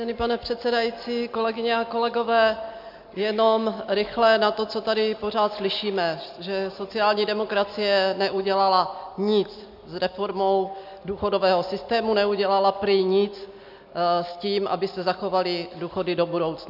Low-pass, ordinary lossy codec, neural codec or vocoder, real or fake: 5.4 kHz; MP3, 48 kbps; none; real